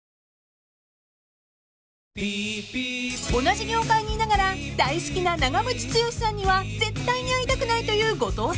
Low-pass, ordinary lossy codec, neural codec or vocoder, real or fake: none; none; none; real